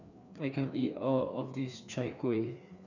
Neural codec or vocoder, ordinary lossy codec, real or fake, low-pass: codec, 16 kHz, 2 kbps, FreqCodec, larger model; none; fake; 7.2 kHz